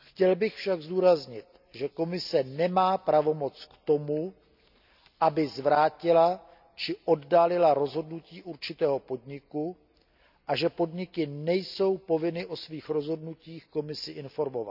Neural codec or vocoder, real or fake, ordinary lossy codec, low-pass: none; real; none; 5.4 kHz